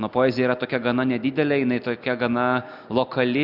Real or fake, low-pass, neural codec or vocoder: real; 5.4 kHz; none